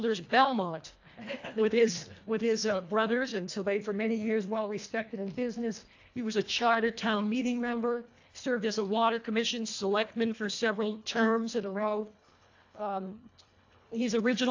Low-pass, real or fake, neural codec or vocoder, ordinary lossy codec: 7.2 kHz; fake; codec, 24 kHz, 1.5 kbps, HILCodec; AAC, 48 kbps